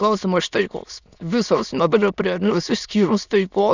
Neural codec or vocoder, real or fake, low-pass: autoencoder, 22.05 kHz, a latent of 192 numbers a frame, VITS, trained on many speakers; fake; 7.2 kHz